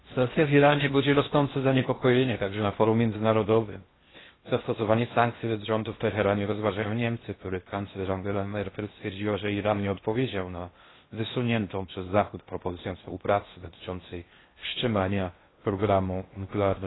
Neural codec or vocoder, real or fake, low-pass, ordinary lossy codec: codec, 16 kHz in and 24 kHz out, 0.6 kbps, FocalCodec, streaming, 4096 codes; fake; 7.2 kHz; AAC, 16 kbps